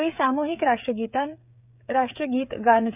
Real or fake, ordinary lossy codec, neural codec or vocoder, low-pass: fake; none; codec, 16 kHz, 16 kbps, FreqCodec, smaller model; 3.6 kHz